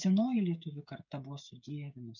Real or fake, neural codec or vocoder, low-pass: fake; codec, 16 kHz, 16 kbps, FreqCodec, smaller model; 7.2 kHz